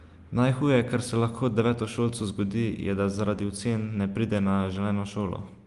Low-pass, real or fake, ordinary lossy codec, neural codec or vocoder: 10.8 kHz; real; Opus, 32 kbps; none